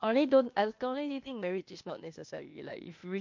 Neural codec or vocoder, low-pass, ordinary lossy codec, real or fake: codec, 16 kHz, 0.8 kbps, ZipCodec; 7.2 kHz; MP3, 48 kbps; fake